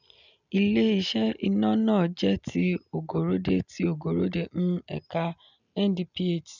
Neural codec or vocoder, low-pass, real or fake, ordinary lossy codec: none; 7.2 kHz; real; none